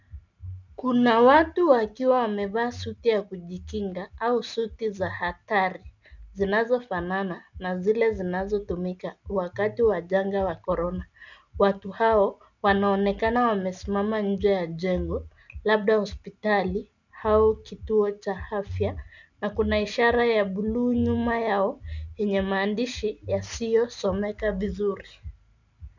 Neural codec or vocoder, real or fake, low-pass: none; real; 7.2 kHz